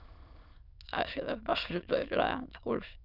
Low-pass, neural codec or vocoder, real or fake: 5.4 kHz; autoencoder, 22.05 kHz, a latent of 192 numbers a frame, VITS, trained on many speakers; fake